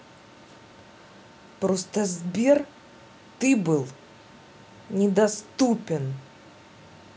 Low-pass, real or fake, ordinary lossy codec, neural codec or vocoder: none; real; none; none